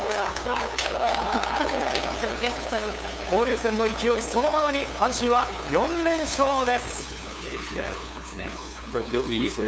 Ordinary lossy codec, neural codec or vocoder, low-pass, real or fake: none; codec, 16 kHz, 2 kbps, FunCodec, trained on LibriTTS, 25 frames a second; none; fake